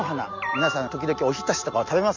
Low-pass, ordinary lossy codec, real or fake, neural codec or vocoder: 7.2 kHz; none; real; none